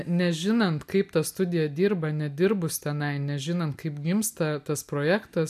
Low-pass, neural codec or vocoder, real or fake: 14.4 kHz; none; real